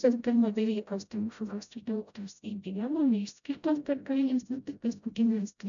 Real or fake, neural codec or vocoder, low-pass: fake; codec, 16 kHz, 0.5 kbps, FreqCodec, smaller model; 7.2 kHz